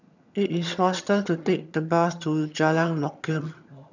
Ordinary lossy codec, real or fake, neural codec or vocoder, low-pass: none; fake; vocoder, 22.05 kHz, 80 mel bands, HiFi-GAN; 7.2 kHz